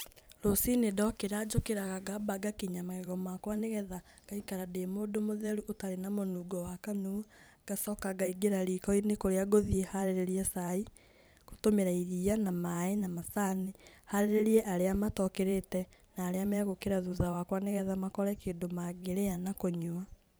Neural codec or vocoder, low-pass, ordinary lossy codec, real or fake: vocoder, 44.1 kHz, 128 mel bands every 512 samples, BigVGAN v2; none; none; fake